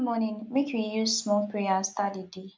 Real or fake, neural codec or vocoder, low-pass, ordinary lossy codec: real; none; none; none